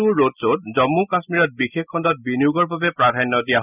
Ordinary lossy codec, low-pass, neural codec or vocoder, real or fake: none; 3.6 kHz; none; real